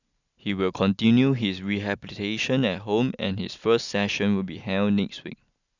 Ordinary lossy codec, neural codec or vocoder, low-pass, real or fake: none; none; 7.2 kHz; real